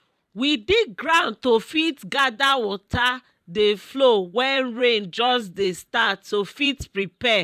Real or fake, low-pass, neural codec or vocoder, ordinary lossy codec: fake; 14.4 kHz; vocoder, 44.1 kHz, 128 mel bands every 512 samples, BigVGAN v2; none